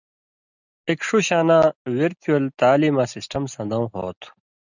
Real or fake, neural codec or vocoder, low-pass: real; none; 7.2 kHz